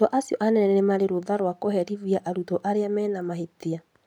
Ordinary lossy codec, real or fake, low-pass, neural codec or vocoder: none; real; 19.8 kHz; none